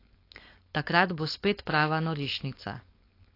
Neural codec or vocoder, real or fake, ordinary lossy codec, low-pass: codec, 16 kHz, 4.8 kbps, FACodec; fake; AAC, 32 kbps; 5.4 kHz